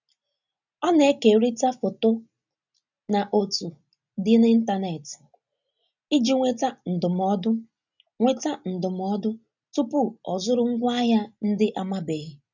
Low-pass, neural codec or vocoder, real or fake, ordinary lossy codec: 7.2 kHz; none; real; none